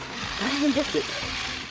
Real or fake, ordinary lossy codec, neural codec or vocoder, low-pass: fake; none; codec, 16 kHz, 16 kbps, FreqCodec, larger model; none